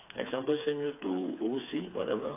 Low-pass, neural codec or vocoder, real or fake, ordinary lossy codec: 3.6 kHz; codec, 16 kHz, 4 kbps, FreqCodec, smaller model; fake; AAC, 24 kbps